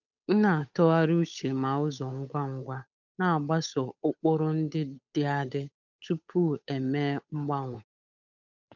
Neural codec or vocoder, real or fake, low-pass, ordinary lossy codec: codec, 16 kHz, 8 kbps, FunCodec, trained on Chinese and English, 25 frames a second; fake; 7.2 kHz; none